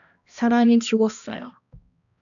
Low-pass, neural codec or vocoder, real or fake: 7.2 kHz; codec, 16 kHz, 1 kbps, X-Codec, HuBERT features, trained on balanced general audio; fake